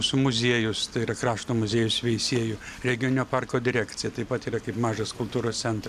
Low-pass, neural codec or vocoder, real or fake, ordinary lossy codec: 14.4 kHz; none; real; AAC, 96 kbps